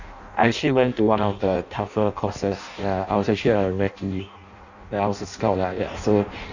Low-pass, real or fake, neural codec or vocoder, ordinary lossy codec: 7.2 kHz; fake; codec, 16 kHz in and 24 kHz out, 0.6 kbps, FireRedTTS-2 codec; none